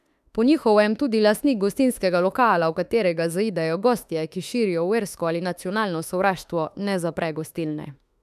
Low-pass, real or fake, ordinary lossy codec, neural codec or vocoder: 14.4 kHz; fake; none; autoencoder, 48 kHz, 32 numbers a frame, DAC-VAE, trained on Japanese speech